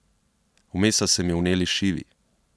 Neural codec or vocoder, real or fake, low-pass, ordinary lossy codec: none; real; none; none